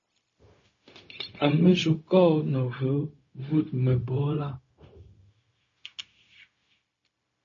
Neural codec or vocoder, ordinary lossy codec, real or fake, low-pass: codec, 16 kHz, 0.4 kbps, LongCat-Audio-Codec; MP3, 32 kbps; fake; 7.2 kHz